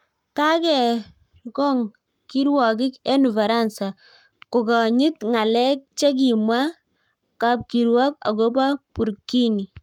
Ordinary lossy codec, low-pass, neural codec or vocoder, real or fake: none; 19.8 kHz; codec, 44.1 kHz, 7.8 kbps, Pupu-Codec; fake